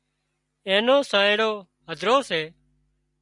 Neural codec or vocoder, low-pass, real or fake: none; 10.8 kHz; real